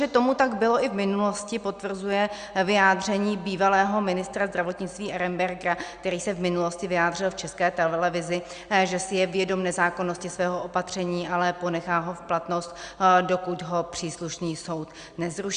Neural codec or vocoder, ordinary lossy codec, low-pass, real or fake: none; Opus, 64 kbps; 9.9 kHz; real